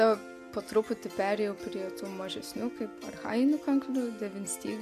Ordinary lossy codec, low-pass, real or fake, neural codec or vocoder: MP3, 64 kbps; 14.4 kHz; real; none